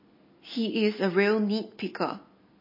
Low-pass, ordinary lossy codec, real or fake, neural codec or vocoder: 5.4 kHz; MP3, 24 kbps; real; none